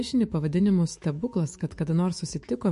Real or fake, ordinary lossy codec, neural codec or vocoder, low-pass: fake; MP3, 48 kbps; autoencoder, 48 kHz, 128 numbers a frame, DAC-VAE, trained on Japanese speech; 14.4 kHz